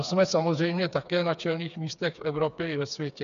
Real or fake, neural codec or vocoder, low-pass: fake; codec, 16 kHz, 4 kbps, FreqCodec, smaller model; 7.2 kHz